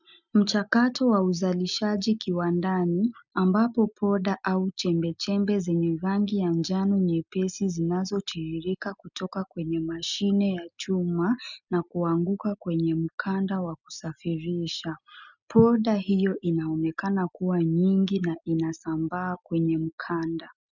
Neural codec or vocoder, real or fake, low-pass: none; real; 7.2 kHz